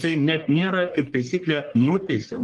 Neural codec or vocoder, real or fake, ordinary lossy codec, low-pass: codec, 44.1 kHz, 1.7 kbps, Pupu-Codec; fake; Opus, 24 kbps; 10.8 kHz